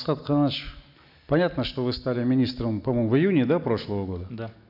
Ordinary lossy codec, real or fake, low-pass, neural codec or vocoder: none; real; 5.4 kHz; none